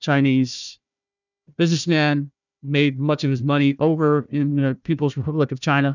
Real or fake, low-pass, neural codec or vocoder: fake; 7.2 kHz; codec, 16 kHz, 1 kbps, FunCodec, trained on Chinese and English, 50 frames a second